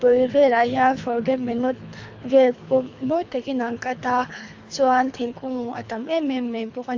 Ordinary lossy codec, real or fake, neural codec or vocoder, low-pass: AAC, 48 kbps; fake; codec, 24 kHz, 3 kbps, HILCodec; 7.2 kHz